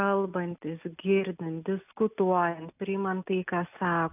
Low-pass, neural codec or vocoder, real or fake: 3.6 kHz; none; real